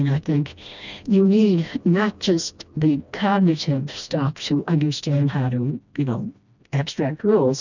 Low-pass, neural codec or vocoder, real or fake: 7.2 kHz; codec, 16 kHz, 1 kbps, FreqCodec, smaller model; fake